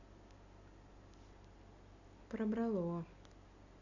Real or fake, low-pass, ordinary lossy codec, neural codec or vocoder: real; 7.2 kHz; none; none